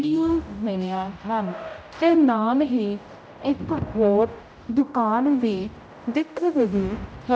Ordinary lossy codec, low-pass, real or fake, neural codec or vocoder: none; none; fake; codec, 16 kHz, 0.5 kbps, X-Codec, HuBERT features, trained on general audio